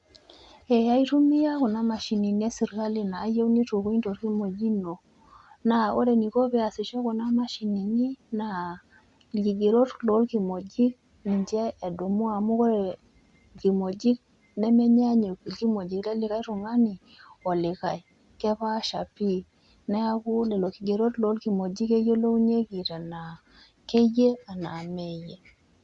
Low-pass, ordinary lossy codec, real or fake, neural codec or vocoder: 10.8 kHz; Opus, 64 kbps; real; none